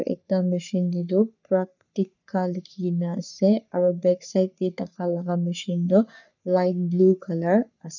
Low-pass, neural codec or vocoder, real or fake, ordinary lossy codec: 7.2 kHz; codec, 44.1 kHz, 3.4 kbps, Pupu-Codec; fake; none